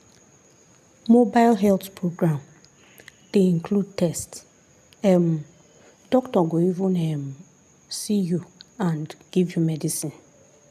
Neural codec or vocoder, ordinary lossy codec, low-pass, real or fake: none; none; 14.4 kHz; real